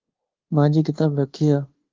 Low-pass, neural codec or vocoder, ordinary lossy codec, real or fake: 7.2 kHz; codec, 24 kHz, 1.2 kbps, DualCodec; Opus, 16 kbps; fake